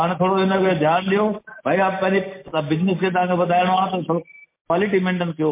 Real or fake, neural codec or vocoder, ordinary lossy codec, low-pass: real; none; MP3, 24 kbps; 3.6 kHz